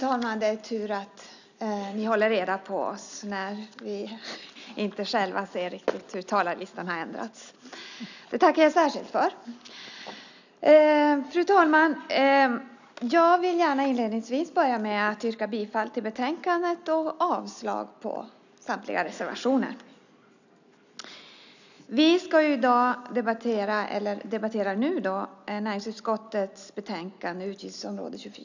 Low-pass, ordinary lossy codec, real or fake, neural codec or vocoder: 7.2 kHz; none; real; none